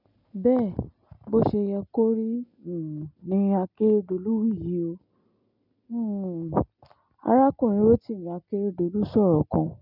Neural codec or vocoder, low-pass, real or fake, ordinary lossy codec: none; 5.4 kHz; real; none